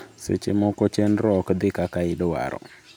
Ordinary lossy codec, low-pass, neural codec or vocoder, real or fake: none; none; none; real